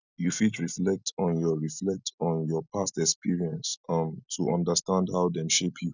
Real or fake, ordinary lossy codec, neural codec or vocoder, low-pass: real; none; none; 7.2 kHz